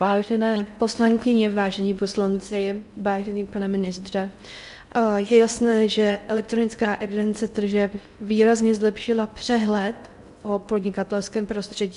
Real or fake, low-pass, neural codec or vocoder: fake; 10.8 kHz; codec, 16 kHz in and 24 kHz out, 0.6 kbps, FocalCodec, streaming, 4096 codes